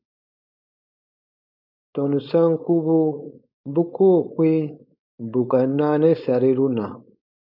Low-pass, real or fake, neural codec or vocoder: 5.4 kHz; fake; codec, 16 kHz, 4.8 kbps, FACodec